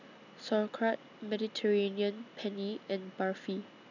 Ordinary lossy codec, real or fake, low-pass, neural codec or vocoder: none; real; 7.2 kHz; none